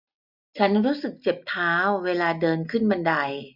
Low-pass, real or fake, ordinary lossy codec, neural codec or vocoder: 5.4 kHz; real; none; none